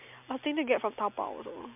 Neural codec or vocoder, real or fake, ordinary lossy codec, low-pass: none; real; MP3, 32 kbps; 3.6 kHz